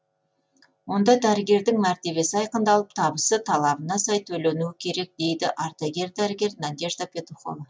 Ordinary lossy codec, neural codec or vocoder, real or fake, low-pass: none; none; real; none